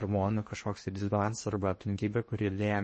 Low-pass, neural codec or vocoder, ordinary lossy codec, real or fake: 10.8 kHz; codec, 16 kHz in and 24 kHz out, 0.8 kbps, FocalCodec, streaming, 65536 codes; MP3, 32 kbps; fake